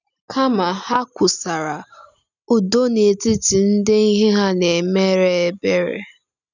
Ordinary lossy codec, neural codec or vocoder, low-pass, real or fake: none; none; 7.2 kHz; real